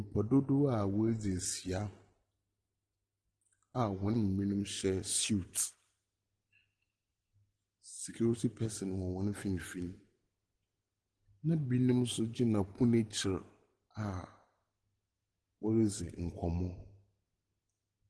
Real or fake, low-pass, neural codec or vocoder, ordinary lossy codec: real; 10.8 kHz; none; Opus, 16 kbps